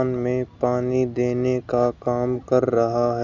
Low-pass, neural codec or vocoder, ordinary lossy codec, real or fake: 7.2 kHz; none; none; real